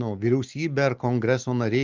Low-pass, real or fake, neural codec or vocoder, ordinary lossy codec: 7.2 kHz; real; none; Opus, 24 kbps